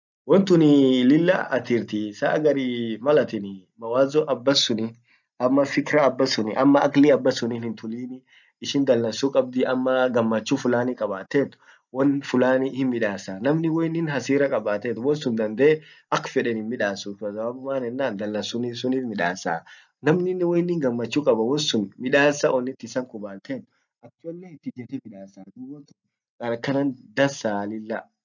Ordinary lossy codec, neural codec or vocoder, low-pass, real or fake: none; none; 7.2 kHz; real